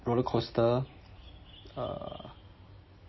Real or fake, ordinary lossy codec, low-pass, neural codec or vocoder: real; MP3, 24 kbps; 7.2 kHz; none